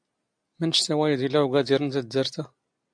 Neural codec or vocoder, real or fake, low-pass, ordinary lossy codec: none; real; 9.9 kHz; AAC, 64 kbps